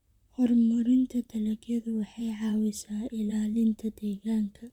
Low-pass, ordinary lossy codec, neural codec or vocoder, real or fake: 19.8 kHz; none; vocoder, 44.1 kHz, 128 mel bands, Pupu-Vocoder; fake